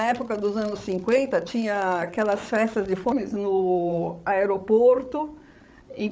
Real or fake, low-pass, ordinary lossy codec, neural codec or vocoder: fake; none; none; codec, 16 kHz, 8 kbps, FreqCodec, larger model